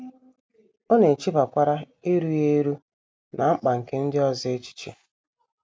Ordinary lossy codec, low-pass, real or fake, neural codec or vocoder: none; none; real; none